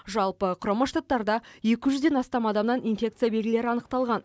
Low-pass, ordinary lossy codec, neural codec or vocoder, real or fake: none; none; none; real